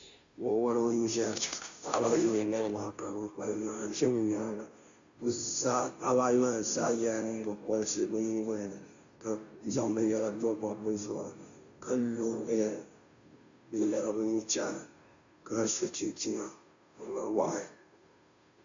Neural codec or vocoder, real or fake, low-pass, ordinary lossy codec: codec, 16 kHz, 0.5 kbps, FunCodec, trained on Chinese and English, 25 frames a second; fake; 7.2 kHz; AAC, 64 kbps